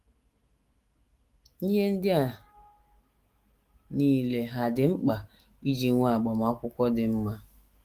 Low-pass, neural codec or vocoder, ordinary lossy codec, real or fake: 14.4 kHz; autoencoder, 48 kHz, 128 numbers a frame, DAC-VAE, trained on Japanese speech; Opus, 24 kbps; fake